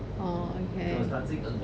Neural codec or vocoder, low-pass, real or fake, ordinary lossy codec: none; none; real; none